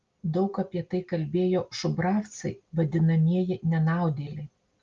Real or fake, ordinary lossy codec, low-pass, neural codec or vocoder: real; Opus, 32 kbps; 7.2 kHz; none